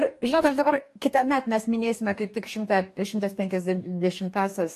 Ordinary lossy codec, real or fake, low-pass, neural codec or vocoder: AAC, 64 kbps; fake; 14.4 kHz; codec, 44.1 kHz, 2.6 kbps, DAC